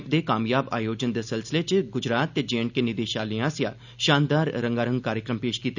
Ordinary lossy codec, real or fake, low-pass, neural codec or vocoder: none; real; 7.2 kHz; none